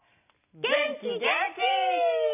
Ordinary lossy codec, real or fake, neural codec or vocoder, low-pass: none; real; none; 3.6 kHz